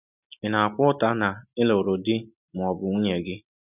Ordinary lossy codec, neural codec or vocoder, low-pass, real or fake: none; none; 3.6 kHz; real